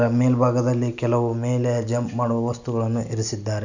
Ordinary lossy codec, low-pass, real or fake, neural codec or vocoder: none; 7.2 kHz; real; none